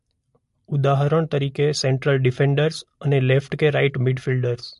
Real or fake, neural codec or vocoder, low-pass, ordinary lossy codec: real; none; 14.4 kHz; MP3, 48 kbps